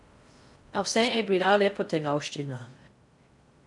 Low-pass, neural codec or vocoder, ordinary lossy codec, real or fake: 10.8 kHz; codec, 16 kHz in and 24 kHz out, 0.6 kbps, FocalCodec, streaming, 4096 codes; none; fake